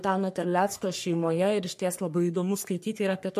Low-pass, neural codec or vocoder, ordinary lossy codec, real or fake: 14.4 kHz; codec, 44.1 kHz, 3.4 kbps, Pupu-Codec; MP3, 64 kbps; fake